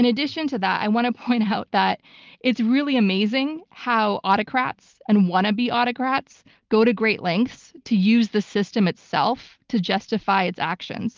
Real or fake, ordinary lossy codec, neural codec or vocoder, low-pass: real; Opus, 24 kbps; none; 7.2 kHz